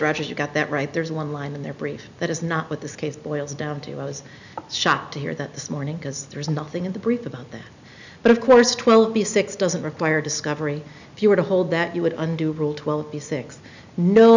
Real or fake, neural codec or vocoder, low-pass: real; none; 7.2 kHz